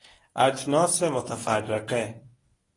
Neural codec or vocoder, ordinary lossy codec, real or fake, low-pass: codec, 24 kHz, 0.9 kbps, WavTokenizer, medium speech release version 1; AAC, 32 kbps; fake; 10.8 kHz